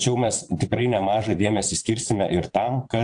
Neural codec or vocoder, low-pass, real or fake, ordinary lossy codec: vocoder, 22.05 kHz, 80 mel bands, WaveNeXt; 9.9 kHz; fake; AAC, 64 kbps